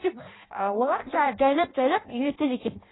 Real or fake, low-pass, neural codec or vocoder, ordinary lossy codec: fake; 7.2 kHz; codec, 16 kHz in and 24 kHz out, 0.6 kbps, FireRedTTS-2 codec; AAC, 16 kbps